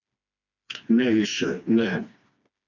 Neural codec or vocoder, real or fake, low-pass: codec, 16 kHz, 2 kbps, FreqCodec, smaller model; fake; 7.2 kHz